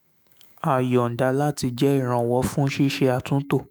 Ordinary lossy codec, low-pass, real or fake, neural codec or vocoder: none; none; fake; autoencoder, 48 kHz, 128 numbers a frame, DAC-VAE, trained on Japanese speech